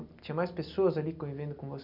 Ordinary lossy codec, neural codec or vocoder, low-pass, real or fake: none; none; 5.4 kHz; real